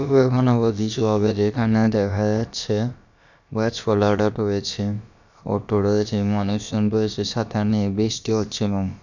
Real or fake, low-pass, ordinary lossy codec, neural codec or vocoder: fake; 7.2 kHz; none; codec, 16 kHz, about 1 kbps, DyCAST, with the encoder's durations